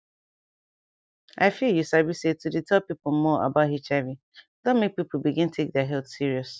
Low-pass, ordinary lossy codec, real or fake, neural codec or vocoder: none; none; real; none